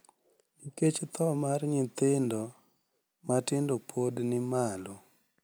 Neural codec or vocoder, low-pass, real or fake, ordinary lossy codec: none; none; real; none